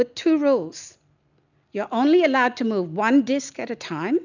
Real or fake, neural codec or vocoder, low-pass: real; none; 7.2 kHz